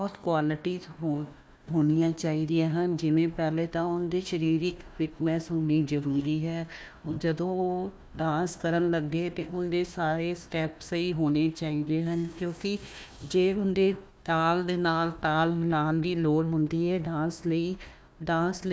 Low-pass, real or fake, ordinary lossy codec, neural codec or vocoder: none; fake; none; codec, 16 kHz, 1 kbps, FunCodec, trained on Chinese and English, 50 frames a second